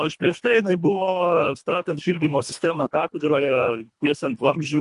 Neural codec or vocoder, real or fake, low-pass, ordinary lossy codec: codec, 24 kHz, 1.5 kbps, HILCodec; fake; 10.8 kHz; MP3, 64 kbps